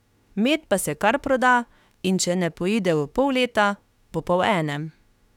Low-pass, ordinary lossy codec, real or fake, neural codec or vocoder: 19.8 kHz; none; fake; autoencoder, 48 kHz, 32 numbers a frame, DAC-VAE, trained on Japanese speech